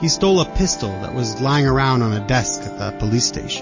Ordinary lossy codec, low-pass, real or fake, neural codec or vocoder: MP3, 32 kbps; 7.2 kHz; real; none